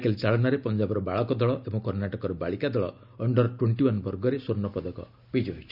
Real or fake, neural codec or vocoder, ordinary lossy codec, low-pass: real; none; none; 5.4 kHz